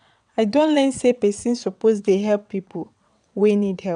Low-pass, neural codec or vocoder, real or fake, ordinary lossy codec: 9.9 kHz; vocoder, 22.05 kHz, 80 mel bands, WaveNeXt; fake; none